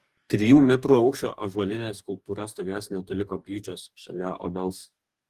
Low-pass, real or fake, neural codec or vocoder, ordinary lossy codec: 14.4 kHz; fake; codec, 44.1 kHz, 2.6 kbps, DAC; Opus, 24 kbps